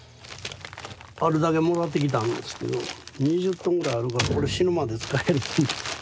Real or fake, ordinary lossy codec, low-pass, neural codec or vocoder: real; none; none; none